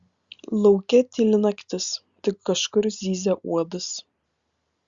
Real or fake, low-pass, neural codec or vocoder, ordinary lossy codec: real; 7.2 kHz; none; Opus, 64 kbps